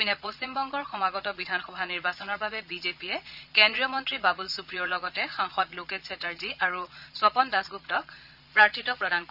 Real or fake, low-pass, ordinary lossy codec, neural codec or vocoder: fake; 5.4 kHz; none; vocoder, 22.05 kHz, 80 mel bands, Vocos